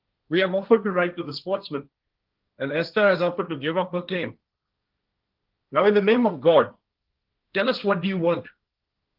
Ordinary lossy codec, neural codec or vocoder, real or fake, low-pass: Opus, 32 kbps; codec, 16 kHz, 1.1 kbps, Voila-Tokenizer; fake; 5.4 kHz